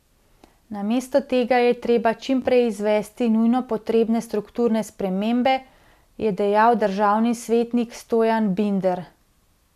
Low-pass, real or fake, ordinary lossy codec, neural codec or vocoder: 14.4 kHz; real; none; none